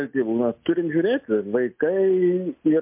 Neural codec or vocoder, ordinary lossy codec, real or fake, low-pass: none; MP3, 32 kbps; real; 3.6 kHz